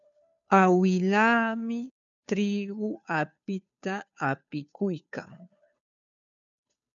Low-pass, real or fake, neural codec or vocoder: 7.2 kHz; fake; codec, 16 kHz, 2 kbps, FunCodec, trained on Chinese and English, 25 frames a second